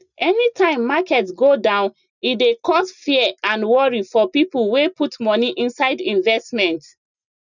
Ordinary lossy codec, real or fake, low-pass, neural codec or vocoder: none; real; 7.2 kHz; none